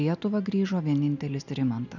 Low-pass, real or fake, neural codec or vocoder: 7.2 kHz; real; none